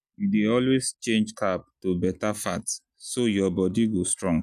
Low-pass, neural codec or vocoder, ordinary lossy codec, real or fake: 14.4 kHz; none; none; real